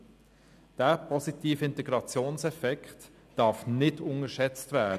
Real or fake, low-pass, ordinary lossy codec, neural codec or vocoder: real; 14.4 kHz; none; none